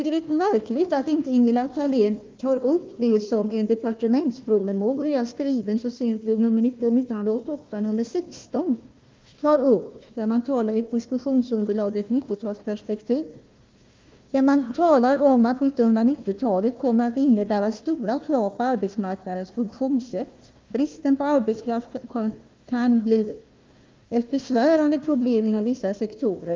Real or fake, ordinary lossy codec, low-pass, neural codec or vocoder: fake; Opus, 24 kbps; 7.2 kHz; codec, 16 kHz, 1 kbps, FunCodec, trained on Chinese and English, 50 frames a second